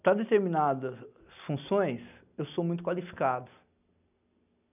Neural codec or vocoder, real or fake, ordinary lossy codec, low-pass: none; real; none; 3.6 kHz